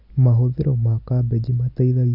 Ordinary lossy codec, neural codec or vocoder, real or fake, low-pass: Opus, 64 kbps; none; real; 5.4 kHz